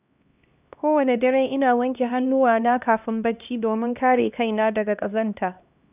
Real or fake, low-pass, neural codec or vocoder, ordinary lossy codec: fake; 3.6 kHz; codec, 16 kHz, 1 kbps, X-Codec, HuBERT features, trained on LibriSpeech; none